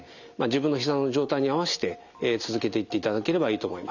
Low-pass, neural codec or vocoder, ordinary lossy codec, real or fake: 7.2 kHz; none; none; real